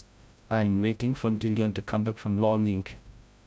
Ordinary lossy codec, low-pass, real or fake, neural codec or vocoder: none; none; fake; codec, 16 kHz, 0.5 kbps, FreqCodec, larger model